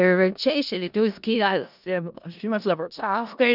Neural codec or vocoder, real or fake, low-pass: codec, 16 kHz in and 24 kHz out, 0.4 kbps, LongCat-Audio-Codec, four codebook decoder; fake; 5.4 kHz